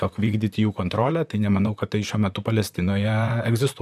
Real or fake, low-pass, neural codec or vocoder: fake; 14.4 kHz; vocoder, 44.1 kHz, 128 mel bands, Pupu-Vocoder